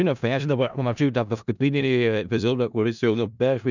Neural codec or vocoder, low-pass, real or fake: codec, 16 kHz in and 24 kHz out, 0.4 kbps, LongCat-Audio-Codec, four codebook decoder; 7.2 kHz; fake